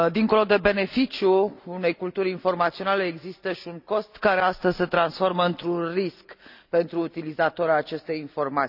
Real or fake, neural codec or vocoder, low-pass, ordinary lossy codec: real; none; 5.4 kHz; none